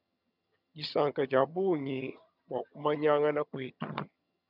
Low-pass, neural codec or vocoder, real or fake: 5.4 kHz; vocoder, 22.05 kHz, 80 mel bands, HiFi-GAN; fake